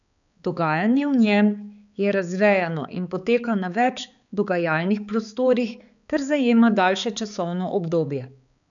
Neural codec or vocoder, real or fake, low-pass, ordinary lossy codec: codec, 16 kHz, 4 kbps, X-Codec, HuBERT features, trained on balanced general audio; fake; 7.2 kHz; none